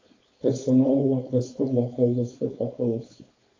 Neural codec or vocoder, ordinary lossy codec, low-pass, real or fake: codec, 16 kHz, 4.8 kbps, FACodec; AAC, 32 kbps; 7.2 kHz; fake